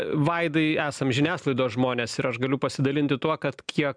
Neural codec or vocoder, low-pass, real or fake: none; 9.9 kHz; real